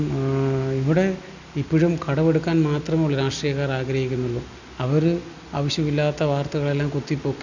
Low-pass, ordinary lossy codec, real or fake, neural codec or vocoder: 7.2 kHz; none; real; none